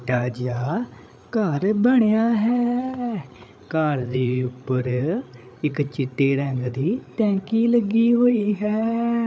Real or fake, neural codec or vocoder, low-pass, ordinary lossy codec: fake; codec, 16 kHz, 8 kbps, FreqCodec, larger model; none; none